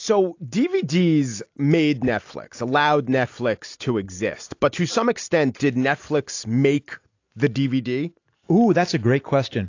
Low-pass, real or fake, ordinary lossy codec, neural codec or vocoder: 7.2 kHz; real; AAC, 48 kbps; none